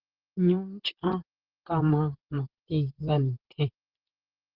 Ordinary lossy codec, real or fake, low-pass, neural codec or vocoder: Opus, 16 kbps; fake; 5.4 kHz; vocoder, 44.1 kHz, 128 mel bands, Pupu-Vocoder